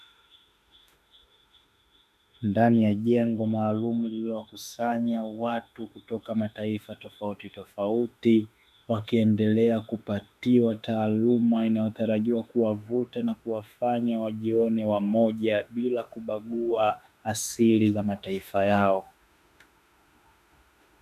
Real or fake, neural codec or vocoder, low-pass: fake; autoencoder, 48 kHz, 32 numbers a frame, DAC-VAE, trained on Japanese speech; 14.4 kHz